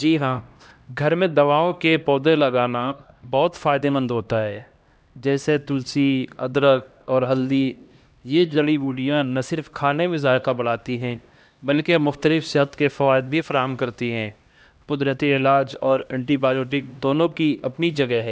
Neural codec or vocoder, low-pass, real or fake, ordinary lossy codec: codec, 16 kHz, 1 kbps, X-Codec, HuBERT features, trained on LibriSpeech; none; fake; none